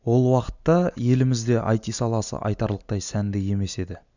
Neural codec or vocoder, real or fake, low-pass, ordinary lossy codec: none; real; 7.2 kHz; none